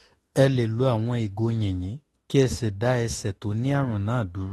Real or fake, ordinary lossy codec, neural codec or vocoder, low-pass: fake; AAC, 32 kbps; autoencoder, 48 kHz, 32 numbers a frame, DAC-VAE, trained on Japanese speech; 19.8 kHz